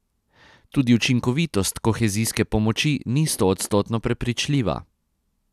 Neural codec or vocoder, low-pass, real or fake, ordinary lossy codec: none; 14.4 kHz; real; none